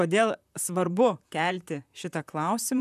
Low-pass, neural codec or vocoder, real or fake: 14.4 kHz; vocoder, 44.1 kHz, 128 mel bands, Pupu-Vocoder; fake